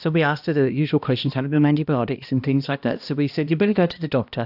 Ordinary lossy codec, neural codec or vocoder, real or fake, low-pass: AAC, 48 kbps; codec, 16 kHz, 1 kbps, X-Codec, HuBERT features, trained on balanced general audio; fake; 5.4 kHz